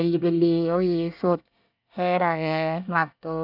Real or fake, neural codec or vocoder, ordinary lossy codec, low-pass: fake; codec, 24 kHz, 1 kbps, SNAC; none; 5.4 kHz